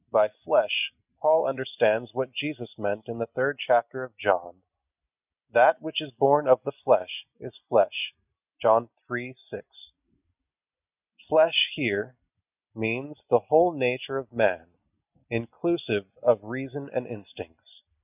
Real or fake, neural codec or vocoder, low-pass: real; none; 3.6 kHz